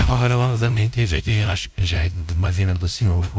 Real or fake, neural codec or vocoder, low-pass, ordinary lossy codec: fake; codec, 16 kHz, 0.5 kbps, FunCodec, trained on LibriTTS, 25 frames a second; none; none